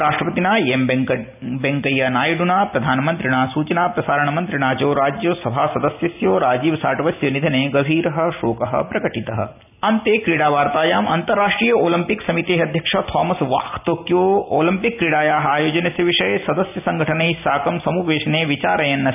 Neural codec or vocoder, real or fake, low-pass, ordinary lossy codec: none; real; 3.6 kHz; none